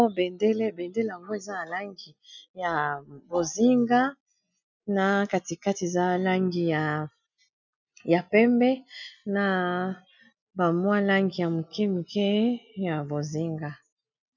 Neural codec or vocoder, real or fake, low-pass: none; real; 7.2 kHz